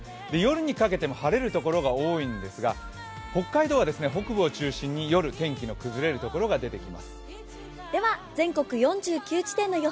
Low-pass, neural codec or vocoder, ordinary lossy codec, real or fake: none; none; none; real